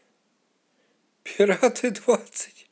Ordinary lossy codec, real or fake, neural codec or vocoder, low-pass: none; real; none; none